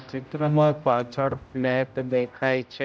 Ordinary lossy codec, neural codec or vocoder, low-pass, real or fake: none; codec, 16 kHz, 0.5 kbps, X-Codec, HuBERT features, trained on general audio; none; fake